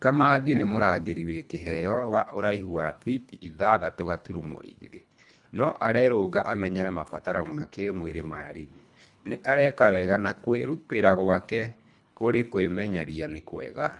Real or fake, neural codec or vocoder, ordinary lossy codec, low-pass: fake; codec, 24 kHz, 1.5 kbps, HILCodec; none; 10.8 kHz